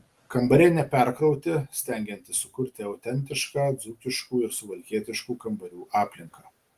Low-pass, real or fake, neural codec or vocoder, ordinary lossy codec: 14.4 kHz; real; none; Opus, 24 kbps